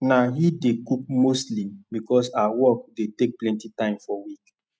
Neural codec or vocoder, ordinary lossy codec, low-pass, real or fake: none; none; none; real